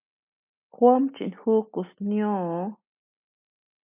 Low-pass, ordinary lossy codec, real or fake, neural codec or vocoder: 3.6 kHz; MP3, 32 kbps; real; none